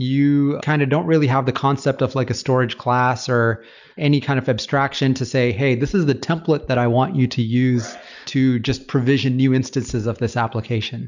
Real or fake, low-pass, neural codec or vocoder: real; 7.2 kHz; none